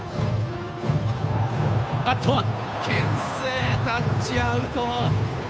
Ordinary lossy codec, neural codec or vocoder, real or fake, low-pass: none; codec, 16 kHz, 2 kbps, FunCodec, trained on Chinese and English, 25 frames a second; fake; none